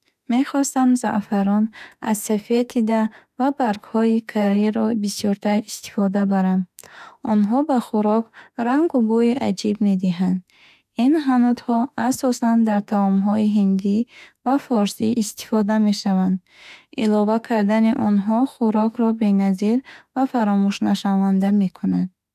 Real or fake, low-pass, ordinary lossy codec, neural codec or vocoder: fake; 14.4 kHz; none; autoencoder, 48 kHz, 32 numbers a frame, DAC-VAE, trained on Japanese speech